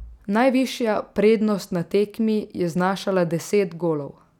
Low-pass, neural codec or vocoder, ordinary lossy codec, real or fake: 19.8 kHz; none; none; real